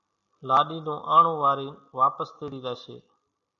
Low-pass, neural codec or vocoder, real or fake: 7.2 kHz; none; real